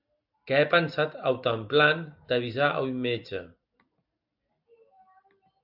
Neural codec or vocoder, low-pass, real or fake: none; 5.4 kHz; real